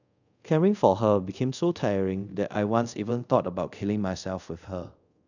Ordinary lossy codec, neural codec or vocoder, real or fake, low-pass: none; codec, 24 kHz, 0.5 kbps, DualCodec; fake; 7.2 kHz